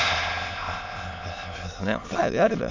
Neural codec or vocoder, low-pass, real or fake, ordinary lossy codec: autoencoder, 22.05 kHz, a latent of 192 numbers a frame, VITS, trained on many speakers; 7.2 kHz; fake; MP3, 48 kbps